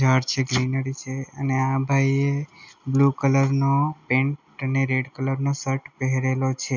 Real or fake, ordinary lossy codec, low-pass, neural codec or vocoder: real; none; 7.2 kHz; none